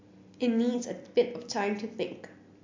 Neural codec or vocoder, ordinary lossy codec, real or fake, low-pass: none; MP3, 48 kbps; real; 7.2 kHz